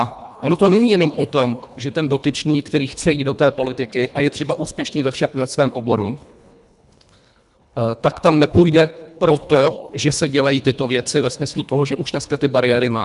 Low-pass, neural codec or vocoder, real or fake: 10.8 kHz; codec, 24 kHz, 1.5 kbps, HILCodec; fake